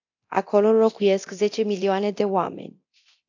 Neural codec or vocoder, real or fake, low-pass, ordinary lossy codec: codec, 24 kHz, 0.9 kbps, DualCodec; fake; 7.2 kHz; AAC, 48 kbps